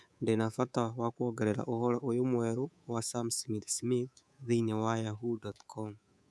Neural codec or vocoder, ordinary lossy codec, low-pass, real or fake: codec, 24 kHz, 3.1 kbps, DualCodec; none; none; fake